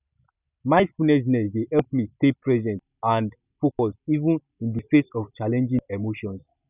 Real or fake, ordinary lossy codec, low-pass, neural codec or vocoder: real; none; 3.6 kHz; none